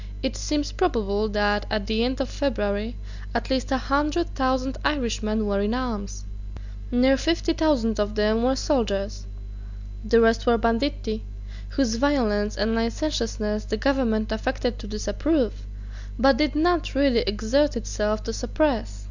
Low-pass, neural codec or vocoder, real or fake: 7.2 kHz; none; real